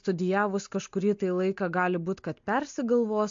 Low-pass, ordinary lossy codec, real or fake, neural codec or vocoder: 7.2 kHz; MP3, 48 kbps; real; none